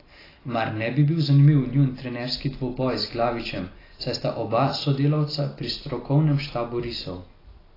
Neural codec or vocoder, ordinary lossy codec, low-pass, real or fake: none; AAC, 24 kbps; 5.4 kHz; real